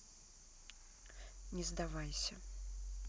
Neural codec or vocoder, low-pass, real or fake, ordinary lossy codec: none; none; real; none